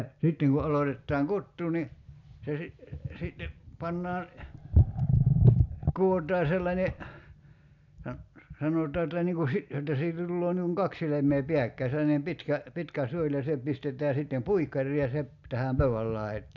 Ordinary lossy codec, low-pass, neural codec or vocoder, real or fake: none; 7.2 kHz; none; real